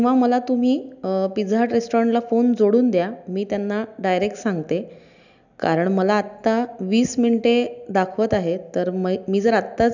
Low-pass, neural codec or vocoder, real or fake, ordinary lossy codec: 7.2 kHz; none; real; none